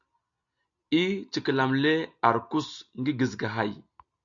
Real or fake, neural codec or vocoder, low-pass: real; none; 7.2 kHz